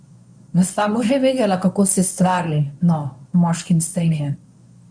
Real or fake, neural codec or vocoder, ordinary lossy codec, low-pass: fake; codec, 24 kHz, 0.9 kbps, WavTokenizer, medium speech release version 1; none; 9.9 kHz